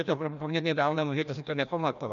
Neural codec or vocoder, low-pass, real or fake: codec, 16 kHz, 1 kbps, FreqCodec, larger model; 7.2 kHz; fake